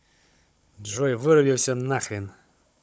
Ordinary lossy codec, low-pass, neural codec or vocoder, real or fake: none; none; codec, 16 kHz, 16 kbps, FunCodec, trained on Chinese and English, 50 frames a second; fake